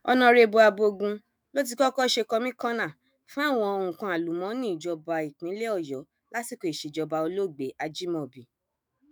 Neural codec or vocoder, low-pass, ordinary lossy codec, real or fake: autoencoder, 48 kHz, 128 numbers a frame, DAC-VAE, trained on Japanese speech; none; none; fake